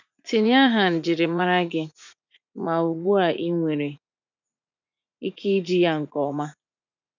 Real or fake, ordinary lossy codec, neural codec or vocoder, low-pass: fake; none; vocoder, 44.1 kHz, 80 mel bands, Vocos; 7.2 kHz